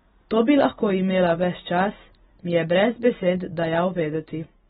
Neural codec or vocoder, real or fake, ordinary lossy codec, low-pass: none; real; AAC, 16 kbps; 7.2 kHz